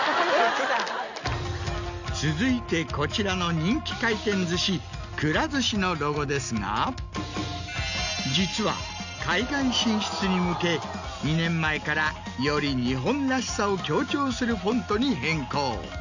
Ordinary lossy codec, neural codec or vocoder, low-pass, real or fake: none; none; 7.2 kHz; real